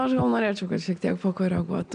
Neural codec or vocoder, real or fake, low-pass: none; real; 9.9 kHz